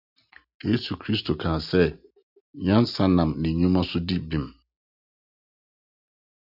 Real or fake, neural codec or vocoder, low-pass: real; none; 5.4 kHz